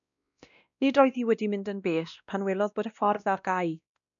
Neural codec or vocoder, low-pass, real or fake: codec, 16 kHz, 1 kbps, X-Codec, WavLM features, trained on Multilingual LibriSpeech; 7.2 kHz; fake